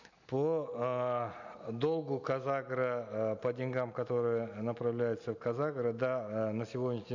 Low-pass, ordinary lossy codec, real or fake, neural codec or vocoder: 7.2 kHz; none; real; none